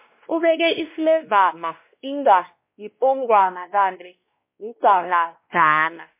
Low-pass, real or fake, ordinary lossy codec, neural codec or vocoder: 3.6 kHz; fake; MP3, 24 kbps; codec, 16 kHz, 1 kbps, X-Codec, WavLM features, trained on Multilingual LibriSpeech